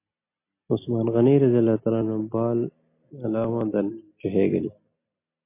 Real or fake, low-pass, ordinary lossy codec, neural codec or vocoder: real; 3.6 kHz; MP3, 24 kbps; none